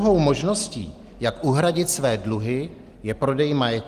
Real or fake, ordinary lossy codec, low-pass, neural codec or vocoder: real; Opus, 24 kbps; 14.4 kHz; none